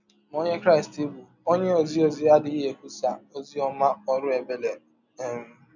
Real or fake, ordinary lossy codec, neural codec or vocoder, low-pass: real; none; none; 7.2 kHz